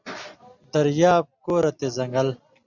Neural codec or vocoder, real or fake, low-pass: none; real; 7.2 kHz